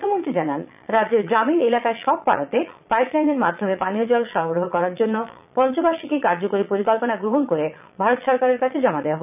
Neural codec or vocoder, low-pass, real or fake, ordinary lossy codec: vocoder, 22.05 kHz, 80 mel bands, Vocos; 3.6 kHz; fake; none